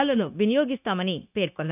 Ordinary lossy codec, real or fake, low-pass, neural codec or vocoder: none; fake; 3.6 kHz; codec, 16 kHz, 0.9 kbps, LongCat-Audio-Codec